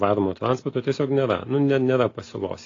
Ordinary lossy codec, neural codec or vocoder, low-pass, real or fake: AAC, 32 kbps; none; 7.2 kHz; real